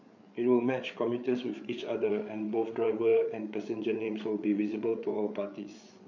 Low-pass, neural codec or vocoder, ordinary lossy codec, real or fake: 7.2 kHz; codec, 16 kHz, 8 kbps, FreqCodec, larger model; none; fake